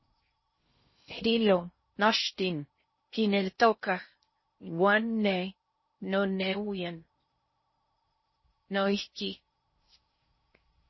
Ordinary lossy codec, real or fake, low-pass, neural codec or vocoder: MP3, 24 kbps; fake; 7.2 kHz; codec, 16 kHz in and 24 kHz out, 0.6 kbps, FocalCodec, streaming, 2048 codes